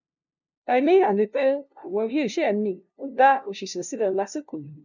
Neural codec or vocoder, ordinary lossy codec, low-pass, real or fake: codec, 16 kHz, 0.5 kbps, FunCodec, trained on LibriTTS, 25 frames a second; none; 7.2 kHz; fake